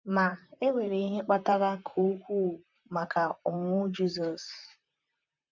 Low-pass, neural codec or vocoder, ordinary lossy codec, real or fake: 7.2 kHz; vocoder, 44.1 kHz, 128 mel bands, Pupu-Vocoder; none; fake